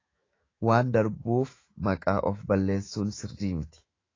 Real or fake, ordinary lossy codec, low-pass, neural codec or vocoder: fake; AAC, 32 kbps; 7.2 kHz; autoencoder, 48 kHz, 128 numbers a frame, DAC-VAE, trained on Japanese speech